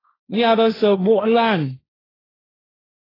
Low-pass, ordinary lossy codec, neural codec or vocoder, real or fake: 5.4 kHz; AAC, 24 kbps; codec, 16 kHz, 1.1 kbps, Voila-Tokenizer; fake